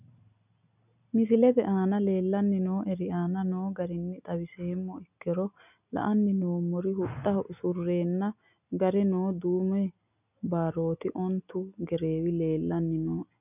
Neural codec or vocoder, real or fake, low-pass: none; real; 3.6 kHz